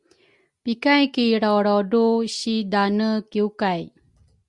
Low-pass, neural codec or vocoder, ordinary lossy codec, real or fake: 10.8 kHz; none; Opus, 64 kbps; real